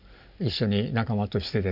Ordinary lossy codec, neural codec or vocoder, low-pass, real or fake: none; none; 5.4 kHz; real